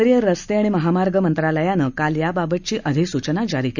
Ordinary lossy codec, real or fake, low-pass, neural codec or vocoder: none; real; 7.2 kHz; none